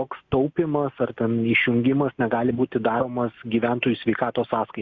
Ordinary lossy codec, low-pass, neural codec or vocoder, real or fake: Opus, 64 kbps; 7.2 kHz; none; real